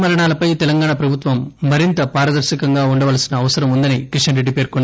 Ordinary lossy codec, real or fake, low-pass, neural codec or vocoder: none; real; none; none